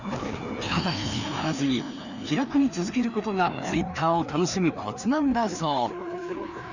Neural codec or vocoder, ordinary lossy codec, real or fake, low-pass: codec, 16 kHz, 2 kbps, FreqCodec, larger model; none; fake; 7.2 kHz